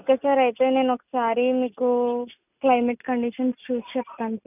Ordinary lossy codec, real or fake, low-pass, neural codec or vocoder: none; real; 3.6 kHz; none